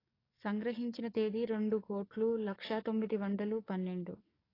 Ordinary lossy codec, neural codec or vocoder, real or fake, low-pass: AAC, 24 kbps; codec, 44.1 kHz, 7.8 kbps, DAC; fake; 5.4 kHz